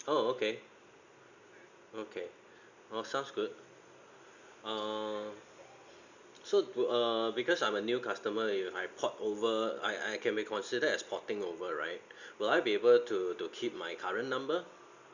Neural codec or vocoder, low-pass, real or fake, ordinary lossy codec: none; 7.2 kHz; real; Opus, 64 kbps